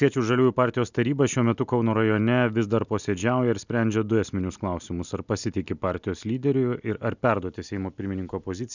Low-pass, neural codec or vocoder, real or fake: 7.2 kHz; none; real